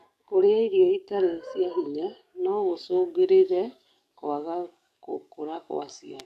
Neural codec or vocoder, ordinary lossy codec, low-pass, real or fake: codec, 44.1 kHz, 7.8 kbps, DAC; none; 14.4 kHz; fake